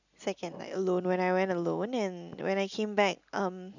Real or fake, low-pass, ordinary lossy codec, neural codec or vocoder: real; 7.2 kHz; none; none